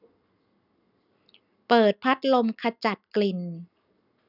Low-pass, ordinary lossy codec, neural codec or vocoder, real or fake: 5.4 kHz; none; none; real